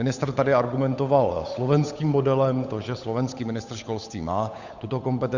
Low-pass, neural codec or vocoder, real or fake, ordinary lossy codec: 7.2 kHz; codec, 16 kHz, 8 kbps, FunCodec, trained on Chinese and English, 25 frames a second; fake; Opus, 64 kbps